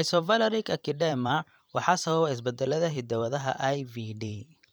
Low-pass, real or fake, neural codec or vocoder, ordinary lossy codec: none; fake; vocoder, 44.1 kHz, 128 mel bands every 512 samples, BigVGAN v2; none